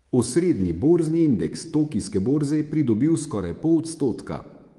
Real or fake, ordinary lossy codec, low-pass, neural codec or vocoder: fake; Opus, 24 kbps; 10.8 kHz; codec, 24 kHz, 3.1 kbps, DualCodec